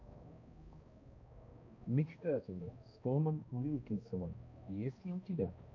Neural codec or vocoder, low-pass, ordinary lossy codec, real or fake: codec, 16 kHz, 1 kbps, X-Codec, HuBERT features, trained on balanced general audio; 7.2 kHz; MP3, 48 kbps; fake